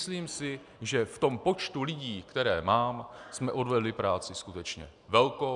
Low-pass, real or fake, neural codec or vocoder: 10.8 kHz; real; none